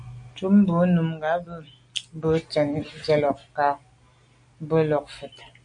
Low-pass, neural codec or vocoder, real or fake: 9.9 kHz; none; real